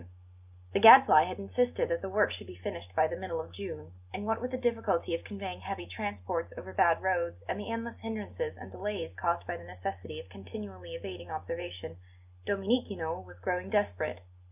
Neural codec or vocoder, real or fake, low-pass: none; real; 3.6 kHz